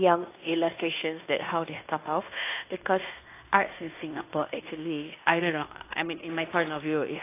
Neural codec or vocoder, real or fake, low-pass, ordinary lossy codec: codec, 16 kHz in and 24 kHz out, 0.9 kbps, LongCat-Audio-Codec, fine tuned four codebook decoder; fake; 3.6 kHz; AAC, 24 kbps